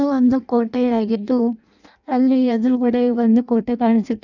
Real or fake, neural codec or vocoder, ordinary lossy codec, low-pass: fake; codec, 16 kHz in and 24 kHz out, 0.6 kbps, FireRedTTS-2 codec; none; 7.2 kHz